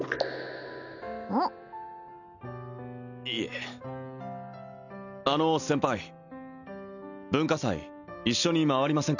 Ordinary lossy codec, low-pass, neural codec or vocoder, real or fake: none; 7.2 kHz; none; real